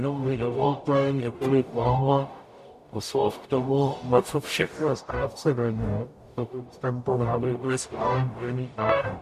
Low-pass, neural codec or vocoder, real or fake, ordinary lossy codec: 14.4 kHz; codec, 44.1 kHz, 0.9 kbps, DAC; fake; AAC, 96 kbps